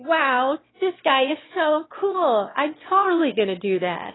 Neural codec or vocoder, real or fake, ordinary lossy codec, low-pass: autoencoder, 22.05 kHz, a latent of 192 numbers a frame, VITS, trained on one speaker; fake; AAC, 16 kbps; 7.2 kHz